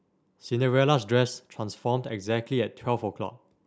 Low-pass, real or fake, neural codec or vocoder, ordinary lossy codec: none; real; none; none